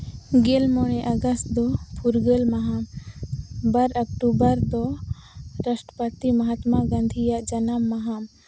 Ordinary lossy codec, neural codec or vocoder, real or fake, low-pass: none; none; real; none